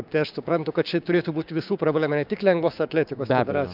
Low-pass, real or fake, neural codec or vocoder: 5.4 kHz; fake; codec, 16 kHz, 6 kbps, DAC